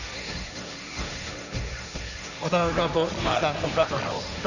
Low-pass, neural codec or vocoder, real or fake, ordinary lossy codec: 7.2 kHz; codec, 16 kHz, 1.1 kbps, Voila-Tokenizer; fake; none